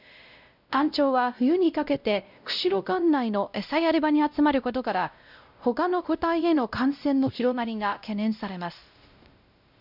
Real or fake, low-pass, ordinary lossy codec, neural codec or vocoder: fake; 5.4 kHz; none; codec, 16 kHz, 0.5 kbps, X-Codec, WavLM features, trained on Multilingual LibriSpeech